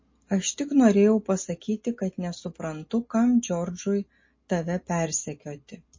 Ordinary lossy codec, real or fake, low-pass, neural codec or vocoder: MP3, 32 kbps; real; 7.2 kHz; none